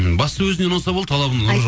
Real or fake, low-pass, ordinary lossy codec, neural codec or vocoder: real; none; none; none